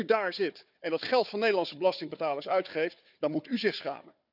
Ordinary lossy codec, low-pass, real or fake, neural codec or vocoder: none; 5.4 kHz; fake; codec, 16 kHz, 4 kbps, FunCodec, trained on Chinese and English, 50 frames a second